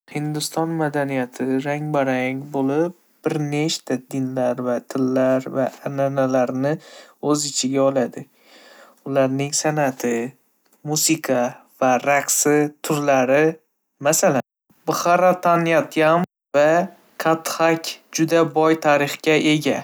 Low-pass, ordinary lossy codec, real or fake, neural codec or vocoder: none; none; real; none